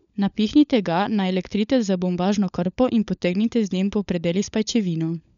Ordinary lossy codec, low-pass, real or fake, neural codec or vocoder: none; 7.2 kHz; fake; codec, 16 kHz, 16 kbps, FunCodec, trained on LibriTTS, 50 frames a second